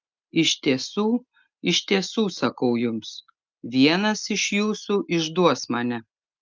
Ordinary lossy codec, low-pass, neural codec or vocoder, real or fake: Opus, 24 kbps; 7.2 kHz; none; real